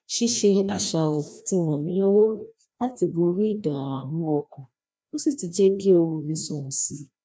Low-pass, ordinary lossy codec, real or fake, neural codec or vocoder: none; none; fake; codec, 16 kHz, 1 kbps, FreqCodec, larger model